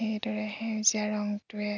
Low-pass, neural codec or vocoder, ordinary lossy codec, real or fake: 7.2 kHz; none; none; real